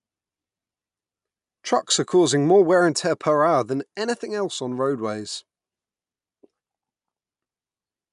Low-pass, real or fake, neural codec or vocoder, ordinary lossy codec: 10.8 kHz; real; none; none